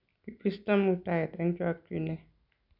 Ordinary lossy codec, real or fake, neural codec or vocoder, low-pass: none; real; none; 5.4 kHz